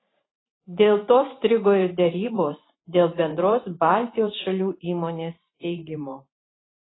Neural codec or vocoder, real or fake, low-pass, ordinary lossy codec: vocoder, 44.1 kHz, 128 mel bands every 512 samples, BigVGAN v2; fake; 7.2 kHz; AAC, 16 kbps